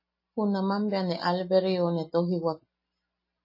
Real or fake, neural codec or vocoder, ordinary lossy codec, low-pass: real; none; MP3, 24 kbps; 5.4 kHz